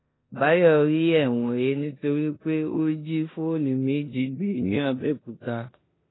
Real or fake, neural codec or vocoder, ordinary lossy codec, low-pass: fake; codec, 16 kHz in and 24 kHz out, 0.9 kbps, LongCat-Audio-Codec, four codebook decoder; AAC, 16 kbps; 7.2 kHz